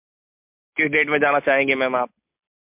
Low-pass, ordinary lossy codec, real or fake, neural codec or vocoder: 3.6 kHz; MP3, 32 kbps; real; none